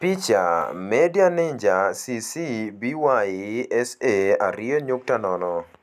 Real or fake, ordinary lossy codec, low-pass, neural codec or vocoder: real; none; 14.4 kHz; none